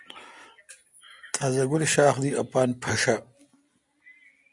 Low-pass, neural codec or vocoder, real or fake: 10.8 kHz; none; real